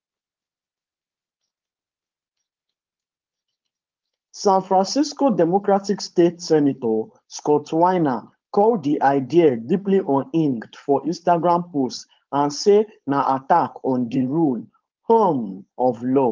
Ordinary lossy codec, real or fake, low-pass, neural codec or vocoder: Opus, 32 kbps; fake; 7.2 kHz; codec, 16 kHz, 4.8 kbps, FACodec